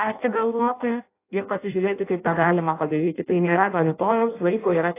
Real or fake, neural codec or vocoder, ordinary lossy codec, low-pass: fake; codec, 16 kHz in and 24 kHz out, 0.6 kbps, FireRedTTS-2 codec; AAC, 24 kbps; 3.6 kHz